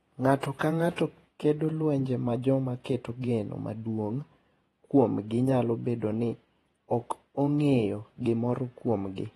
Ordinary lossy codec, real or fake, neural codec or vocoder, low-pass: AAC, 32 kbps; fake; vocoder, 48 kHz, 128 mel bands, Vocos; 19.8 kHz